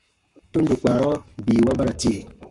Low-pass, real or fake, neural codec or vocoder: 10.8 kHz; fake; codec, 44.1 kHz, 7.8 kbps, Pupu-Codec